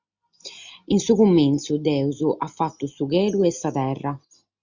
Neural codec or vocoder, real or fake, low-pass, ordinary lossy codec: none; real; 7.2 kHz; Opus, 64 kbps